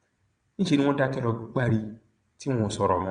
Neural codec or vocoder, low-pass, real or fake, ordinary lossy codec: vocoder, 22.05 kHz, 80 mel bands, WaveNeXt; 9.9 kHz; fake; Opus, 64 kbps